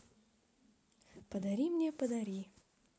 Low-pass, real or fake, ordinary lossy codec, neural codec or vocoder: none; real; none; none